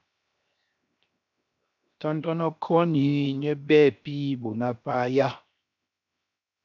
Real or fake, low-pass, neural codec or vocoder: fake; 7.2 kHz; codec, 16 kHz, 0.7 kbps, FocalCodec